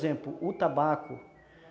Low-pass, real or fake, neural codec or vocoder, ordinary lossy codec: none; real; none; none